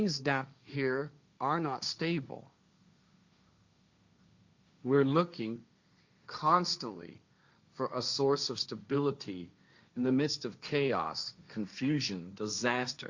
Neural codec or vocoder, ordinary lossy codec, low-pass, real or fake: codec, 16 kHz, 1.1 kbps, Voila-Tokenizer; Opus, 64 kbps; 7.2 kHz; fake